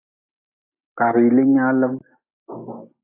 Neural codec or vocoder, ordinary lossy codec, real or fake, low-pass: none; AAC, 24 kbps; real; 3.6 kHz